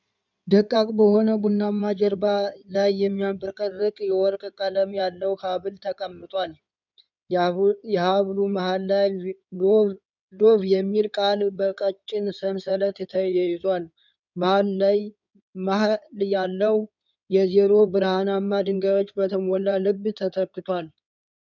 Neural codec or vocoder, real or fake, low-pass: codec, 16 kHz in and 24 kHz out, 2.2 kbps, FireRedTTS-2 codec; fake; 7.2 kHz